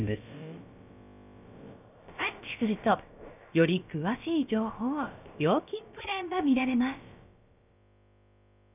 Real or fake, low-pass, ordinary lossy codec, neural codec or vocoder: fake; 3.6 kHz; none; codec, 16 kHz, about 1 kbps, DyCAST, with the encoder's durations